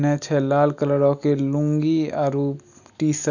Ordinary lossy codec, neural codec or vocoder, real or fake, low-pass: none; none; real; 7.2 kHz